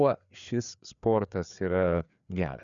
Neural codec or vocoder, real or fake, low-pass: codec, 16 kHz, 4 kbps, FreqCodec, larger model; fake; 7.2 kHz